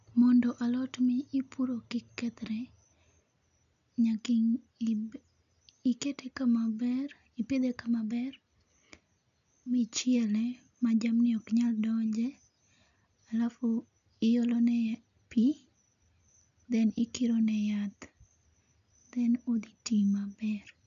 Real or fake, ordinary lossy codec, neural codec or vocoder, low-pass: real; none; none; 7.2 kHz